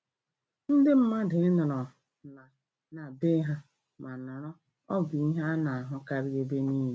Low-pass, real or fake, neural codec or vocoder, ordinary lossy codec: none; real; none; none